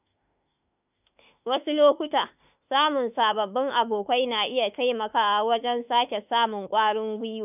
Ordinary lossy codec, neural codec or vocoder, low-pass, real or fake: none; autoencoder, 48 kHz, 32 numbers a frame, DAC-VAE, trained on Japanese speech; 3.6 kHz; fake